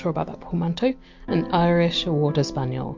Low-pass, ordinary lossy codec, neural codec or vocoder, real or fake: 7.2 kHz; MP3, 48 kbps; none; real